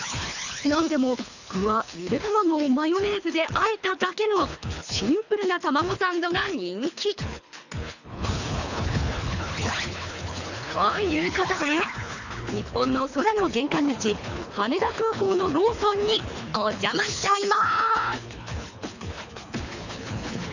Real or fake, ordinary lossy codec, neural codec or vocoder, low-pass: fake; none; codec, 24 kHz, 3 kbps, HILCodec; 7.2 kHz